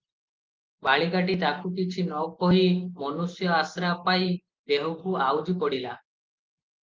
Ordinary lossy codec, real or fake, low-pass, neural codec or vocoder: Opus, 32 kbps; real; 7.2 kHz; none